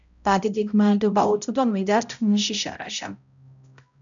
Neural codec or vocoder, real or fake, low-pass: codec, 16 kHz, 0.5 kbps, X-Codec, HuBERT features, trained on balanced general audio; fake; 7.2 kHz